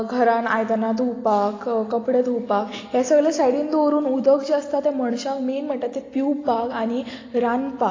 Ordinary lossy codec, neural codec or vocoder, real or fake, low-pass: AAC, 32 kbps; none; real; 7.2 kHz